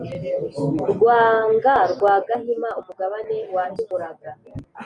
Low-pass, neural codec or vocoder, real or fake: 10.8 kHz; none; real